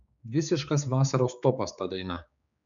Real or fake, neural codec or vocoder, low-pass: fake; codec, 16 kHz, 4 kbps, X-Codec, HuBERT features, trained on general audio; 7.2 kHz